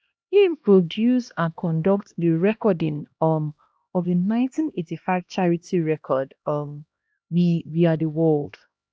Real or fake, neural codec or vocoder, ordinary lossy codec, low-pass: fake; codec, 16 kHz, 1 kbps, X-Codec, HuBERT features, trained on LibriSpeech; none; none